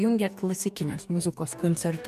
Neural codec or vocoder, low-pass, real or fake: codec, 44.1 kHz, 2.6 kbps, DAC; 14.4 kHz; fake